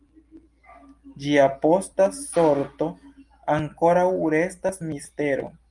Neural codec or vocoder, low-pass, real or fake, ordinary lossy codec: none; 10.8 kHz; real; Opus, 32 kbps